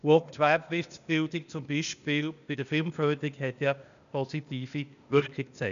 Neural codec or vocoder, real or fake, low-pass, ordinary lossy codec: codec, 16 kHz, 0.8 kbps, ZipCodec; fake; 7.2 kHz; none